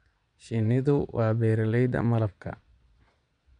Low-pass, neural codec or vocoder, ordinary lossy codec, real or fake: 9.9 kHz; vocoder, 22.05 kHz, 80 mel bands, Vocos; none; fake